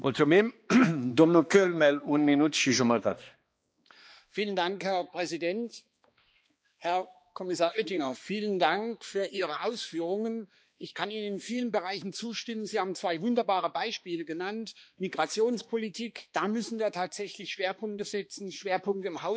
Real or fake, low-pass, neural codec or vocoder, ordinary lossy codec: fake; none; codec, 16 kHz, 2 kbps, X-Codec, HuBERT features, trained on balanced general audio; none